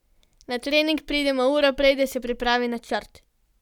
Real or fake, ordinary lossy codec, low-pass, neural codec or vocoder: real; none; 19.8 kHz; none